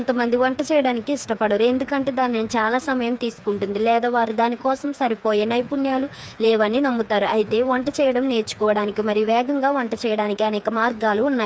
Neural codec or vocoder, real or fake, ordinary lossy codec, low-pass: codec, 16 kHz, 4 kbps, FreqCodec, smaller model; fake; none; none